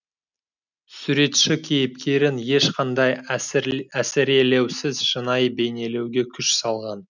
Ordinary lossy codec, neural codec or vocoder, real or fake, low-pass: none; none; real; 7.2 kHz